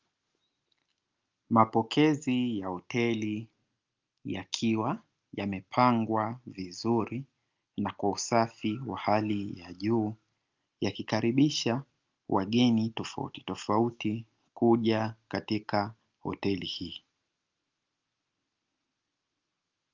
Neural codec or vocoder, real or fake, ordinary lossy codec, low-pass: none; real; Opus, 24 kbps; 7.2 kHz